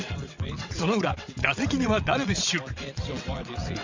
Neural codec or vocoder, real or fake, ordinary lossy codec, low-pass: vocoder, 22.05 kHz, 80 mel bands, WaveNeXt; fake; MP3, 64 kbps; 7.2 kHz